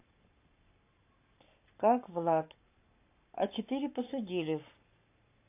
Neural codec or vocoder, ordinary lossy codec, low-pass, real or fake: codec, 44.1 kHz, 7.8 kbps, Pupu-Codec; AAC, 24 kbps; 3.6 kHz; fake